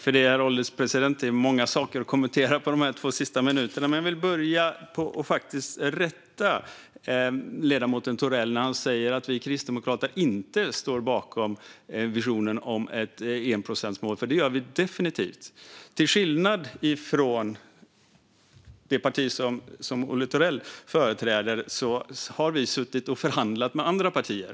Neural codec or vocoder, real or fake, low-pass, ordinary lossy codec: none; real; none; none